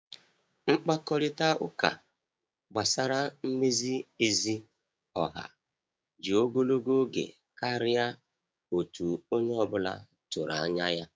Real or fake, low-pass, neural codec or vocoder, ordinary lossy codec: fake; none; codec, 16 kHz, 6 kbps, DAC; none